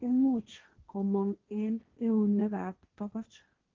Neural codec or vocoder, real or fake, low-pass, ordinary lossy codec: codec, 16 kHz, 1.1 kbps, Voila-Tokenizer; fake; 7.2 kHz; Opus, 16 kbps